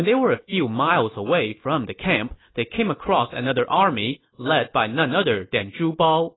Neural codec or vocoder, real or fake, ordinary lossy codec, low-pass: none; real; AAC, 16 kbps; 7.2 kHz